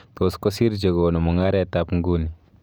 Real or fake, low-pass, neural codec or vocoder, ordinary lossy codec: real; none; none; none